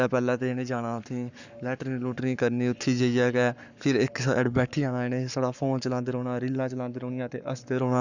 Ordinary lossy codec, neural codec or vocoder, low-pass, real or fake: none; codec, 44.1 kHz, 7.8 kbps, Pupu-Codec; 7.2 kHz; fake